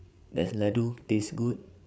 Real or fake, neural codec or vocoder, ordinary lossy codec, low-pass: fake; codec, 16 kHz, 8 kbps, FreqCodec, larger model; none; none